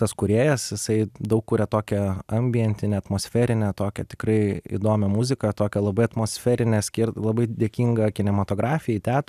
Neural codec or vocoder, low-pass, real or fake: none; 14.4 kHz; real